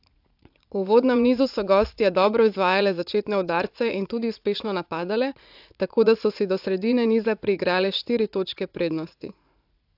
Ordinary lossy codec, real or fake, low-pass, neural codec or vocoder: AAC, 48 kbps; fake; 5.4 kHz; vocoder, 44.1 kHz, 80 mel bands, Vocos